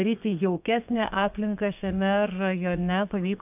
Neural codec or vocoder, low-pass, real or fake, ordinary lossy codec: codec, 32 kHz, 1.9 kbps, SNAC; 3.6 kHz; fake; AAC, 32 kbps